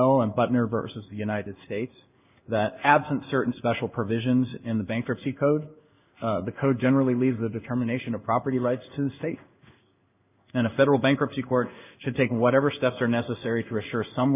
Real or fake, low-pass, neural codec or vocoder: fake; 3.6 kHz; codec, 16 kHz in and 24 kHz out, 1 kbps, XY-Tokenizer